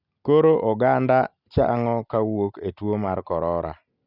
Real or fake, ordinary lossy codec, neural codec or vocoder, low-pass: real; none; none; 5.4 kHz